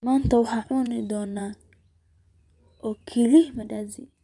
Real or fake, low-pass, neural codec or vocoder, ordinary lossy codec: fake; 10.8 kHz; vocoder, 24 kHz, 100 mel bands, Vocos; none